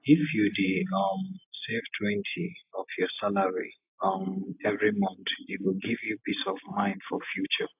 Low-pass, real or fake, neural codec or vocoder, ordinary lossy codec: 3.6 kHz; real; none; AAC, 32 kbps